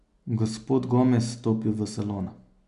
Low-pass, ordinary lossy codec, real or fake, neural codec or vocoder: 10.8 kHz; MP3, 96 kbps; real; none